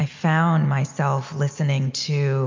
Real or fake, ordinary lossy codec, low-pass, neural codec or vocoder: real; MP3, 64 kbps; 7.2 kHz; none